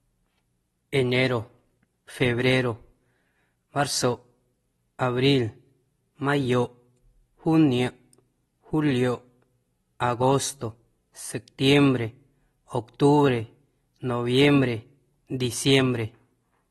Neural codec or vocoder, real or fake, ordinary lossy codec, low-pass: none; real; AAC, 32 kbps; 19.8 kHz